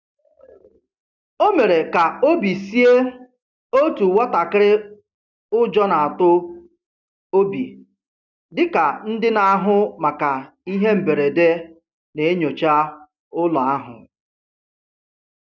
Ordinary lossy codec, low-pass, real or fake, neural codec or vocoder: none; 7.2 kHz; real; none